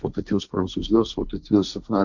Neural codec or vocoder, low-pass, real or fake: codec, 16 kHz, 1.1 kbps, Voila-Tokenizer; 7.2 kHz; fake